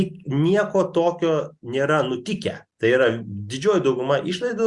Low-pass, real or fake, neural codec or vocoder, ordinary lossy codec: 10.8 kHz; real; none; Opus, 64 kbps